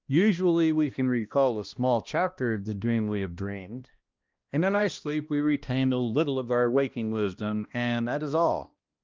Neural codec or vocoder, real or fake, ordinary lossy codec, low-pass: codec, 16 kHz, 1 kbps, X-Codec, HuBERT features, trained on balanced general audio; fake; Opus, 32 kbps; 7.2 kHz